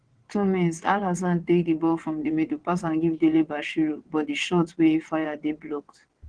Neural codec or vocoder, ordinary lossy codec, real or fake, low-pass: vocoder, 22.05 kHz, 80 mel bands, WaveNeXt; Opus, 16 kbps; fake; 9.9 kHz